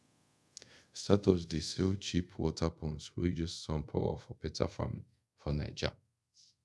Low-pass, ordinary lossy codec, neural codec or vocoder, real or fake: none; none; codec, 24 kHz, 0.5 kbps, DualCodec; fake